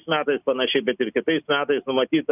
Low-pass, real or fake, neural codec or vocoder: 3.6 kHz; real; none